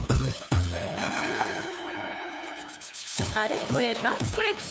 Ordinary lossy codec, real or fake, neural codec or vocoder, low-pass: none; fake; codec, 16 kHz, 2 kbps, FunCodec, trained on LibriTTS, 25 frames a second; none